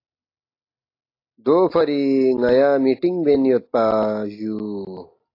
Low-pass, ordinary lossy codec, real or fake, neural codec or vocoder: 5.4 kHz; AAC, 32 kbps; real; none